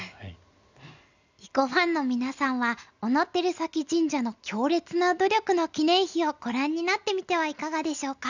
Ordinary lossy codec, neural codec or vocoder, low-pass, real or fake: none; none; 7.2 kHz; real